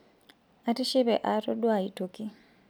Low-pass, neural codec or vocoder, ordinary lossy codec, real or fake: none; none; none; real